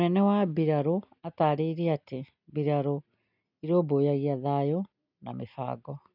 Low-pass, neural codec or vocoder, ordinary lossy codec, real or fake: 5.4 kHz; none; none; real